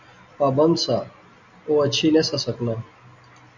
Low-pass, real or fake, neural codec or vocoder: 7.2 kHz; real; none